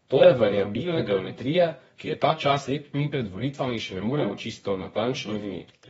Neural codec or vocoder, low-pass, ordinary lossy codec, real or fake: codec, 24 kHz, 0.9 kbps, WavTokenizer, medium music audio release; 10.8 kHz; AAC, 24 kbps; fake